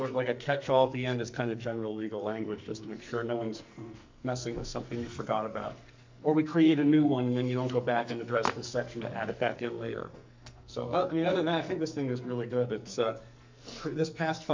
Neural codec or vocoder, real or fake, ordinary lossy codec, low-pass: codec, 44.1 kHz, 2.6 kbps, SNAC; fake; MP3, 64 kbps; 7.2 kHz